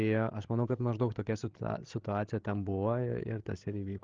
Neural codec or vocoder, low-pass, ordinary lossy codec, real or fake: codec, 16 kHz, 16 kbps, FreqCodec, larger model; 7.2 kHz; Opus, 16 kbps; fake